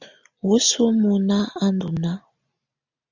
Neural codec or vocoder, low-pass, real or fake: none; 7.2 kHz; real